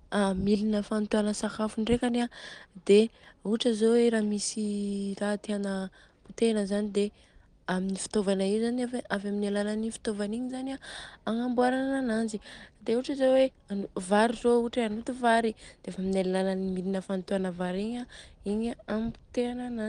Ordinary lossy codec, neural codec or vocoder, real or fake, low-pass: Opus, 24 kbps; none; real; 9.9 kHz